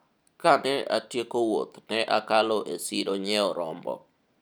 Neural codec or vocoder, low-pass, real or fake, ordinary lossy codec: none; none; real; none